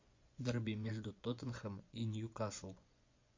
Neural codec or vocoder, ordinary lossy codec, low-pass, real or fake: vocoder, 24 kHz, 100 mel bands, Vocos; MP3, 48 kbps; 7.2 kHz; fake